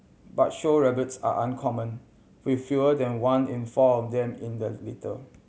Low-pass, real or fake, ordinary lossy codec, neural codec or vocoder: none; real; none; none